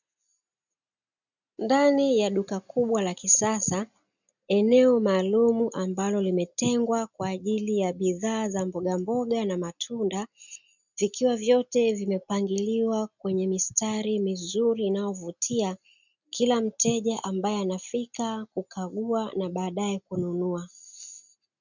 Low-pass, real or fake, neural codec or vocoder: 7.2 kHz; real; none